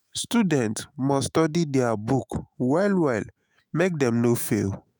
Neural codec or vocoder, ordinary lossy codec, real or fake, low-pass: autoencoder, 48 kHz, 128 numbers a frame, DAC-VAE, trained on Japanese speech; none; fake; none